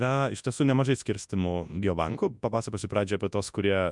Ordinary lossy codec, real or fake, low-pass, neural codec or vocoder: MP3, 96 kbps; fake; 10.8 kHz; codec, 24 kHz, 0.9 kbps, WavTokenizer, large speech release